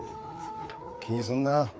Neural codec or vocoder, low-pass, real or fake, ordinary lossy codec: codec, 16 kHz, 4 kbps, FreqCodec, larger model; none; fake; none